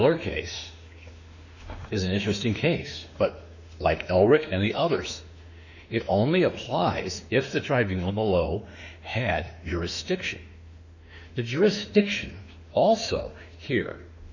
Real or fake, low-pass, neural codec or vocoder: fake; 7.2 kHz; autoencoder, 48 kHz, 32 numbers a frame, DAC-VAE, trained on Japanese speech